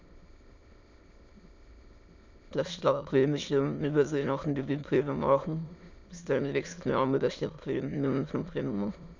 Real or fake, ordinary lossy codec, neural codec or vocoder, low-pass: fake; MP3, 64 kbps; autoencoder, 22.05 kHz, a latent of 192 numbers a frame, VITS, trained on many speakers; 7.2 kHz